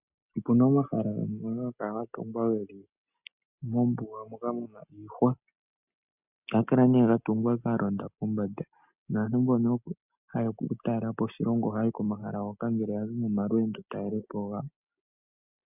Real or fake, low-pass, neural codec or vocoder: real; 3.6 kHz; none